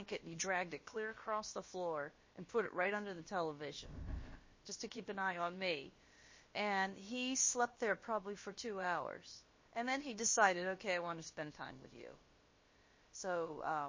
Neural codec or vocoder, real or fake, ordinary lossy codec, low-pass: codec, 16 kHz, about 1 kbps, DyCAST, with the encoder's durations; fake; MP3, 32 kbps; 7.2 kHz